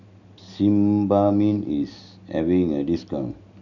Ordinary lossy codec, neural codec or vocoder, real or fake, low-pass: none; none; real; 7.2 kHz